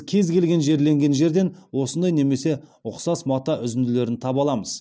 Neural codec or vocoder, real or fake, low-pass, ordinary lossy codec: none; real; none; none